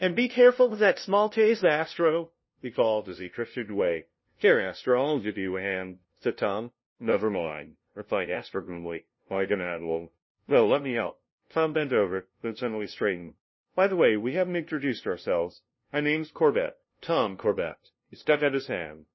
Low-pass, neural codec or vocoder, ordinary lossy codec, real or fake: 7.2 kHz; codec, 16 kHz, 0.5 kbps, FunCodec, trained on LibriTTS, 25 frames a second; MP3, 24 kbps; fake